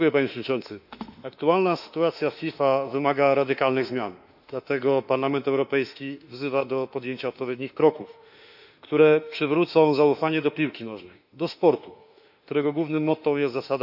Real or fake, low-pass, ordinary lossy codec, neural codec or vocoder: fake; 5.4 kHz; none; autoencoder, 48 kHz, 32 numbers a frame, DAC-VAE, trained on Japanese speech